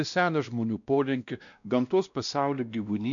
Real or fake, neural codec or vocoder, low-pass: fake; codec, 16 kHz, 1 kbps, X-Codec, WavLM features, trained on Multilingual LibriSpeech; 7.2 kHz